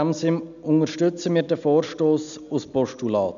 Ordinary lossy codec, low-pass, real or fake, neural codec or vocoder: none; 7.2 kHz; real; none